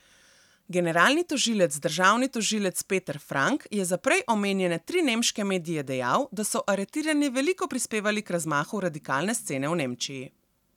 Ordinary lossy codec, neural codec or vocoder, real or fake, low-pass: none; none; real; 19.8 kHz